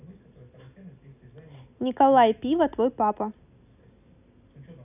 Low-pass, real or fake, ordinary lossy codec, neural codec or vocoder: 3.6 kHz; real; none; none